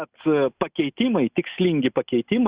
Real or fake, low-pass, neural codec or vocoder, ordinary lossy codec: real; 3.6 kHz; none; Opus, 64 kbps